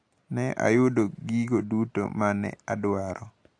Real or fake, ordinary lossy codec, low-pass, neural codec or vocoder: real; AAC, 48 kbps; 9.9 kHz; none